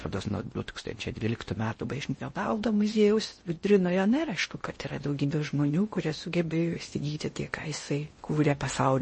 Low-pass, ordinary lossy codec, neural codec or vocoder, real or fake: 10.8 kHz; MP3, 32 kbps; codec, 16 kHz in and 24 kHz out, 0.6 kbps, FocalCodec, streaming, 4096 codes; fake